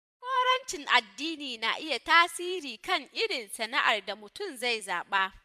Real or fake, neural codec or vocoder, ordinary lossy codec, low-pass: fake; vocoder, 44.1 kHz, 128 mel bands every 256 samples, BigVGAN v2; MP3, 96 kbps; 14.4 kHz